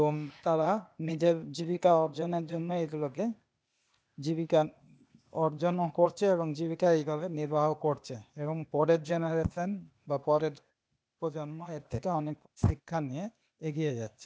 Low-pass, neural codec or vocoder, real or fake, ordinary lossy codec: none; codec, 16 kHz, 0.8 kbps, ZipCodec; fake; none